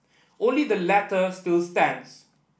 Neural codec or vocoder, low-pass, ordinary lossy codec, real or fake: none; none; none; real